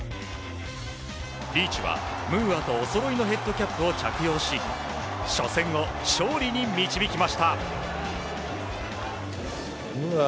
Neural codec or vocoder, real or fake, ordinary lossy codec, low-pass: none; real; none; none